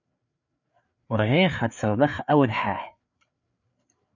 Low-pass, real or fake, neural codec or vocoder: 7.2 kHz; fake; codec, 16 kHz, 4 kbps, FreqCodec, larger model